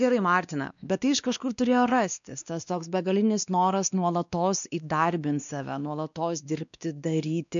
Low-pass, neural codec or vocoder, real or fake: 7.2 kHz; codec, 16 kHz, 2 kbps, X-Codec, WavLM features, trained on Multilingual LibriSpeech; fake